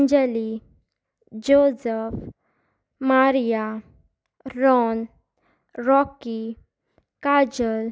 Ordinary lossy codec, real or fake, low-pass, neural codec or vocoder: none; real; none; none